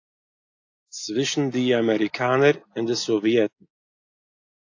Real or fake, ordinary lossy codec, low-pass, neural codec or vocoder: real; AAC, 48 kbps; 7.2 kHz; none